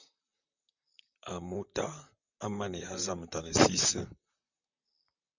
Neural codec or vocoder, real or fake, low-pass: vocoder, 44.1 kHz, 128 mel bands, Pupu-Vocoder; fake; 7.2 kHz